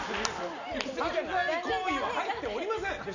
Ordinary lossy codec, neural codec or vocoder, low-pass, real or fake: none; none; 7.2 kHz; real